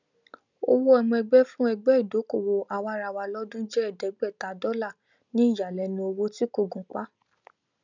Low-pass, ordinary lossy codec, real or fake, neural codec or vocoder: 7.2 kHz; none; real; none